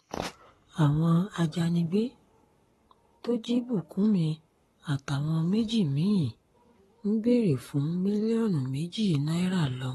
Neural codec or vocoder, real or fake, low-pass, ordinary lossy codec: codec, 44.1 kHz, 7.8 kbps, Pupu-Codec; fake; 19.8 kHz; AAC, 32 kbps